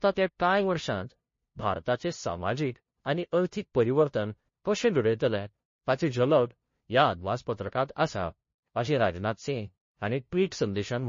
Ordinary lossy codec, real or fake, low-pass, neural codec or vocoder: MP3, 32 kbps; fake; 7.2 kHz; codec, 16 kHz, 0.5 kbps, FunCodec, trained on LibriTTS, 25 frames a second